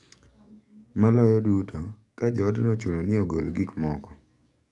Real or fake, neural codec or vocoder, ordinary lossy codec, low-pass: fake; codec, 44.1 kHz, 7.8 kbps, DAC; none; 10.8 kHz